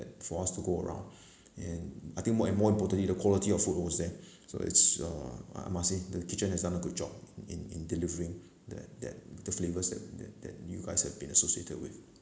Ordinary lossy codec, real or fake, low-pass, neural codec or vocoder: none; real; none; none